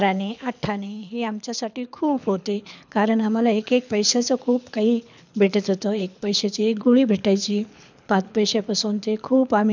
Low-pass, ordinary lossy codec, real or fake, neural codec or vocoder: 7.2 kHz; none; fake; codec, 24 kHz, 6 kbps, HILCodec